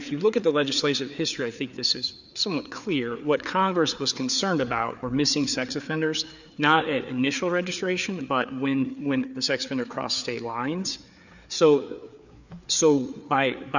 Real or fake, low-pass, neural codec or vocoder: fake; 7.2 kHz; codec, 16 kHz, 4 kbps, FreqCodec, larger model